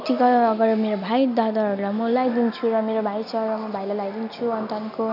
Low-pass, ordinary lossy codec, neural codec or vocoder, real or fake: 5.4 kHz; none; none; real